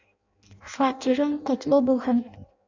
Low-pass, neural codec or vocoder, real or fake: 7.2 kHz; codec, 16 kHz in and 24 kHz out, 0.6 kbps, FireRedTTS-2 codec; fake